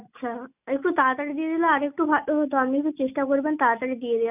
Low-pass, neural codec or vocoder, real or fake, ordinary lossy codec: 3.6 kHz; none; real; none